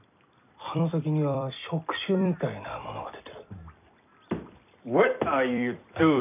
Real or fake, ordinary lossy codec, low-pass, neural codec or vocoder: fake; none; 3.6 kHz; vocoder, 44.1 kHz, 128 mel bands every 256 samples, BigVGAN v2